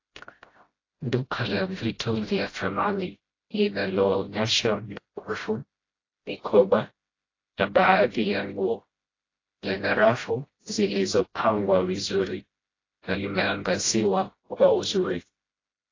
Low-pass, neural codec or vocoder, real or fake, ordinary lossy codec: 7.2 kHz; codec, 16 kHz, 0.5 kbps, FreqCodec, smaller model; fake; AAC, 32 kbps